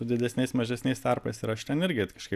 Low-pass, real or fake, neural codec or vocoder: 14.4 kHz; real; none